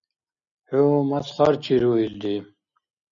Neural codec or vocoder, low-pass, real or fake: none; 7.2 kHz; real